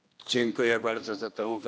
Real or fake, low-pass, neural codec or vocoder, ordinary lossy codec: fake; none; codec, 16 kHz, 1 kbps, X-Codec, HuBERT features, trained on general audio; none